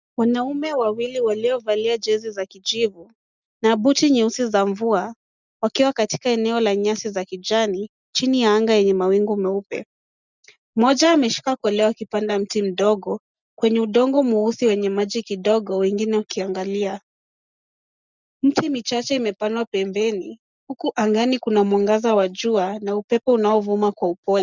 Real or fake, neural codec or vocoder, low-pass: real; none; 7.2 kHz